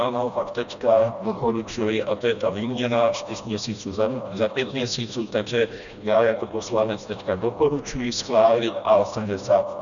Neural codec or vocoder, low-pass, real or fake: codec, 16 kHz, 1 kbps, FreqCodec, smaller model; 7.2 kHz; fake